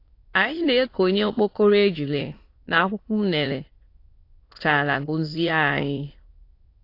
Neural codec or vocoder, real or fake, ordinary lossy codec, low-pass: autoencoder, 22.05 kHz, a latent of 192 numbers a frame, VITS, trained on many speakers; fake; AAC, 32 kbps; 5.4 kHz